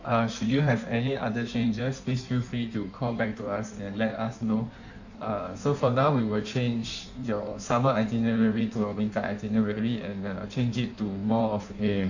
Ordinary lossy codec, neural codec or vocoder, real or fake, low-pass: none; codec, 16 kHz in and 24 kHz out, 1.1 kbps, FireRedTTS-2 codec; fake; 7.2 kHz